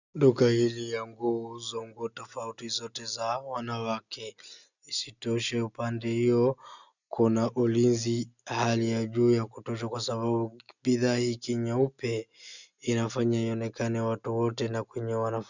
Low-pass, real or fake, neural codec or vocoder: 7.2 kHz; real; none